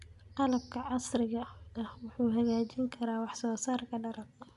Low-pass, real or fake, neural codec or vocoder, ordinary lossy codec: 10.8 kHz; real; none; none